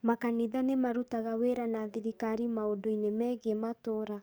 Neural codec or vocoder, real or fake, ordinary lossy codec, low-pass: codec, 44.1 kHz, 7.8 kbps, DAC; fake; none; none